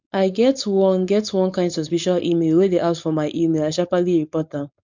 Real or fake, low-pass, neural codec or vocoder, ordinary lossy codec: fake; 7.2 kHz; codec, 16 kHz, 4.8 kbps, FACodec; none